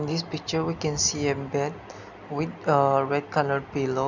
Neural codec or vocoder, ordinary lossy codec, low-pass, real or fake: none; none; 7.2 kHz; real